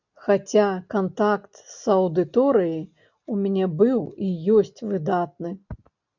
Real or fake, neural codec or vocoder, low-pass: real; none; 7.2 kHz